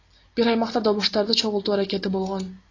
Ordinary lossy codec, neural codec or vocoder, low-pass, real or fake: AAC, 32 kbps; none; 7.2 kHz; real